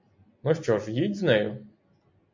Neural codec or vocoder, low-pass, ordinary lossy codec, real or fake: none; 7.2 kHz; MP3, 48 kbps; real